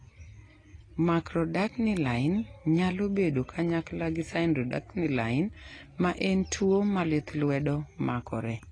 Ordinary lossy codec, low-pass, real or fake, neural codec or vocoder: AAC, 32 kbps; 9.9 kHz; real; none